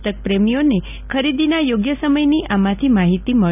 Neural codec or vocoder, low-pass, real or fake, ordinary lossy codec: none; 3.6 kHz; real; none